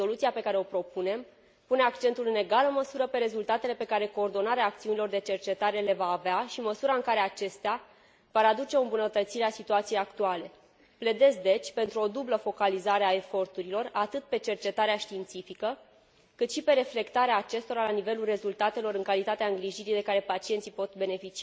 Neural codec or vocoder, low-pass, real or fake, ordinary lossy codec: none; none; real; none